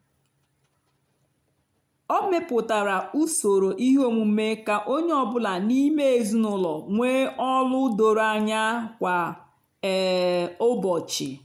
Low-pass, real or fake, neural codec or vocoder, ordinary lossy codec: 19.8 kHz; real; none; MP3, 96 kbps